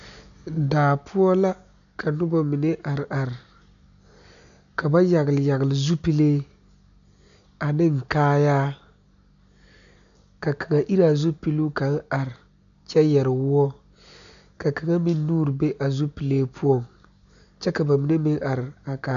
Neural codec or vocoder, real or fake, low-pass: none; real; 7.2 kHz